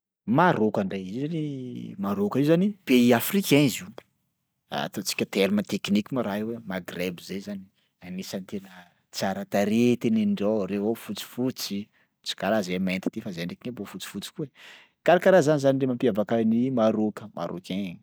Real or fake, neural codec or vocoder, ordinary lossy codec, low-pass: real; none; none; none